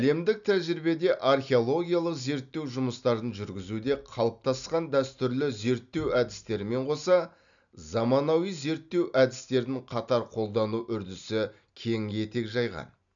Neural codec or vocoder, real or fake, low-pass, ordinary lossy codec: none; real; 7.2 kHz; none